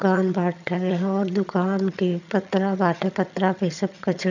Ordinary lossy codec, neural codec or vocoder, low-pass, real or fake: none; vocoder, 22.05 kHz, 80 mel bands, HiFi-GAN; 7.2 kHz; fake